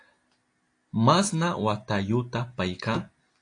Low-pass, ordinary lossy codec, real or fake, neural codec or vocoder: 9.9 kHz; AAC, 48 kbps; real; none